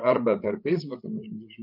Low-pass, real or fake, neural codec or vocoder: 5.4 kHz; fake; codec, 16 kHz, 8 kbps, FreqCodec, larger model